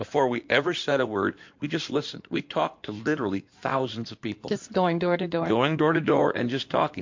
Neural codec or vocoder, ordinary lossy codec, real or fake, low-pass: codec, 16 kHz in and 24 kHz out, 2.2 kbps, FireRedTTS-2 codec; MP3, 48 kbps; fake; 7.2 kHz